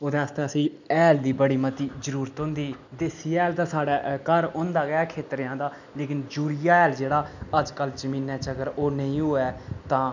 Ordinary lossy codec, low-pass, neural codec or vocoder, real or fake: none; 7.2 kHz; none; real